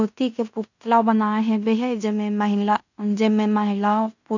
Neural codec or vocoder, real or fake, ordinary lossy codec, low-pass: codec, 16 kHz in and 24 kHz out, 0.9 kbps, LongCat-Audio-Codec, fine tuned four codebook decoder; fake; none; 7.2 kHz